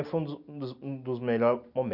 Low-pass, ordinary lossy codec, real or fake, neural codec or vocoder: 5.4 kHz; none; real; none